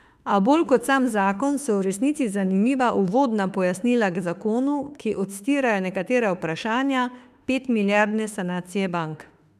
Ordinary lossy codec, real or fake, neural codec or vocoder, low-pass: none; fake; autoencoder, 48 kHz, 32 numbers a frame, DAC-VAE, trained on Japanese speech; 14.4 kHz